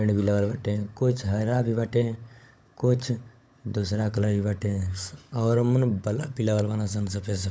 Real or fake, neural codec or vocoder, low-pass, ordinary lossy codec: fake; codec, 16 kHz, 16 kbps, FunCodec, trained on LibriTTS, 50 frames a second; none; none